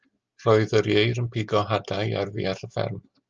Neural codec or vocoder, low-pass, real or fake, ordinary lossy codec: none; 7.2 kHz; real; Opus, 24 kbps